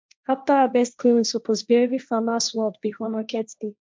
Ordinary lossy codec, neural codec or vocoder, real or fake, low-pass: none; codec, 16 kHz, 1.1 kbps, Voila-Tokenizer; fake; none